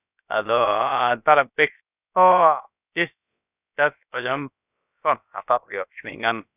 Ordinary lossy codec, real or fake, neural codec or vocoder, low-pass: none; fake; codec, 16 kHz, about 1 kbps, DyCAST, with the encoder's durations; 3.6 kHz